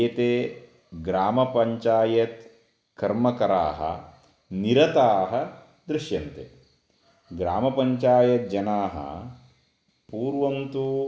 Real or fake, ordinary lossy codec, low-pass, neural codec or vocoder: real; none; none; none